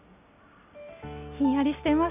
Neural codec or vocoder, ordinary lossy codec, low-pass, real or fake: none; none; 3.6 kHz; real